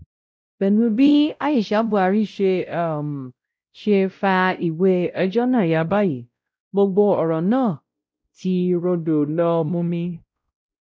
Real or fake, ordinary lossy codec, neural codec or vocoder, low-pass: fake; none; codec, 16 kHz, 0.5 kbps, X-Codec, WavLM features, trained on Multilingual LibriSpeech; none